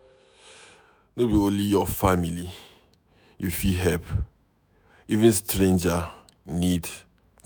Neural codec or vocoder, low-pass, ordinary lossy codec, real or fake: autoencoder, 48 kHz, 128 numbers a frame, DAC-VAE, trained on Japanese speech; none; none; fake